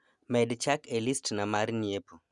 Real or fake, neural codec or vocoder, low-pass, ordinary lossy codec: real; none; 10.8 kHz; Opus, 64 kbps